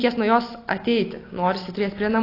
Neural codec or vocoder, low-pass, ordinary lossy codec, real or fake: none; 5.4 kHz; AAC, 24 kbps; real